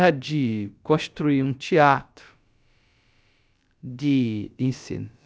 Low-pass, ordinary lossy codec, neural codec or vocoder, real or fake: none; none; codec, 16 kHz, about 1 kbps, DyCAST, with the encoder's durations; fake